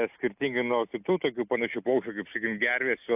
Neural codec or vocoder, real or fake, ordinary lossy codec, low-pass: none; real; AAC, 32 kbps; 3.6 kHz